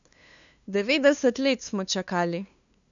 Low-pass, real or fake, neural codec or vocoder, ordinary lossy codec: 7.2 kHz; fake; codec, 16 kHz, 2 kbps, FunCodec, trained on LibriTTS, 25 frames a second; none